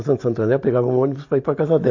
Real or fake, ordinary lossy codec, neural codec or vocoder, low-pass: real; AAC, 48 kbps; none; 7.2 kHz